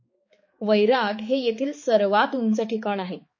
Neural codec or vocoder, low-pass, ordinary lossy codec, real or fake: codec, 16 kHz, 4 kbps, X-Codec, HuBERT features, trained on balanced general audio; 7.2 kHz; MP3, 32 kbps; fake